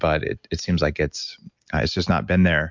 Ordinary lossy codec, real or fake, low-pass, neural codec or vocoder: MP3, 64 kbps; real; 7.2 kHz; none